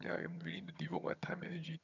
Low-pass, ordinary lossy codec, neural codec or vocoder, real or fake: 7.2 kHz; none; vocoder, 22.05 kHz, 80 mel bands, HiFi-GAN; fake